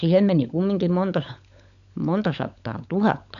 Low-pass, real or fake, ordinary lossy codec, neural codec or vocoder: 7.2 kHz; fake; none; codec, 16 kHz, 16 kbps, FunCodec, trained on LibriTTS, 50 frames a second